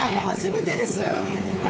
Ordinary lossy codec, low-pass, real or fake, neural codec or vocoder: none; none; fake; codec, 16 kHz, 4 kbps, X-Codec, WavLM features, trained on Multilingual LibriSpeech